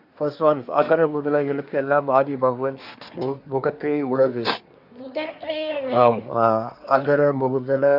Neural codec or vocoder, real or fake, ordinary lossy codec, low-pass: codec, 24 kHz, 1 kbps, SNAC; fake; none; 5.4 kHz